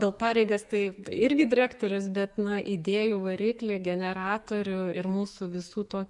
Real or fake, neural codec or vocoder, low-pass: fake; codec, 44.1 kHz, 2.6 kbps, SNAC; 10.8 kHz